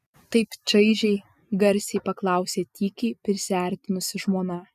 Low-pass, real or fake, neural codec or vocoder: 14.4 kHz; real; none